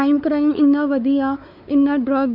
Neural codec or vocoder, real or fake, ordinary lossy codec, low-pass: codec, 16 kHz, 4 kbps, X-Codec, WavLM features, trained on Multilingual LibriSpeech; fake; none; 5.4 kHz